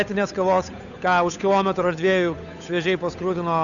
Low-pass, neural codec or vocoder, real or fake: 7.2 kHz; codec, 16 kHz, 8 kbps, FunCodec, trained on Chinese and English, 25 frames a second; fake